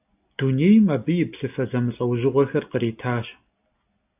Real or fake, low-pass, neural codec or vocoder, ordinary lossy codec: real; 3.6 kHz; none; AAC, 32 kbps